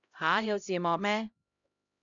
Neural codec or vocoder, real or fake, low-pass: codec, 16 kHz, 0.5 kbps, X-Codec, HuBERT features, trained on LibriSpeech; fake; 7.2 kHz